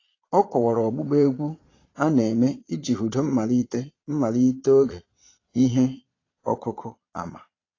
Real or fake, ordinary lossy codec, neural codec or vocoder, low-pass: fake; AAC, 32 kbps; vocoder, 22.05 kHz, 80 mel bands, Vocos; 7.2 kHz